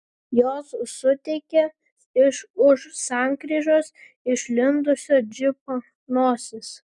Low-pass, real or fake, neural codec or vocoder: 10.8 kHz; real; none